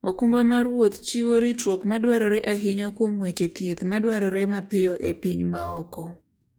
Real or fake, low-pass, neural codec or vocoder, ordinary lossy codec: fake; none; codec, 44.1 kHz, 2.6 kbps, DAC; none